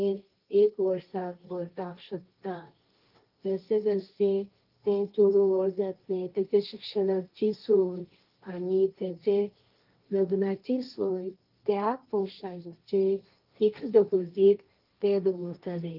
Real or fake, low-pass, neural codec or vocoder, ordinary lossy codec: fake; 5.4 kHz; codec, 16 kHz, 1.1 kbps, Voila-Tokenizer; Opus, 32 kbps